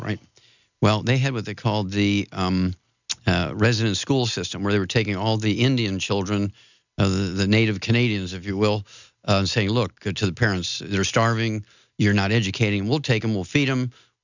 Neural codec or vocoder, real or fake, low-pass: none; real; 7.2 kHz